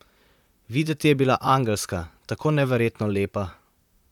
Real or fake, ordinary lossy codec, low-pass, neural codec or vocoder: fake; none; 19.8 kHz; vocoder, 44.1 kHz, 128 mel bands, Pupu-Vocoder